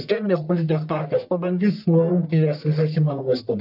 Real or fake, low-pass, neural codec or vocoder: fake; 5.4 kHz; codec, 44.1 kHz, 1.7 kbps, Pupu-Codec